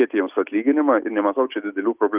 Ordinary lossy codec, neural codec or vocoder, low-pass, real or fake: Opus, 32 kbps; none; 3.6 kHz; real